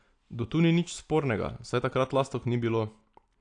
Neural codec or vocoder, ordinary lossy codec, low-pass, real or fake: none; AAC, 48 kbps; 9.9 kHz; real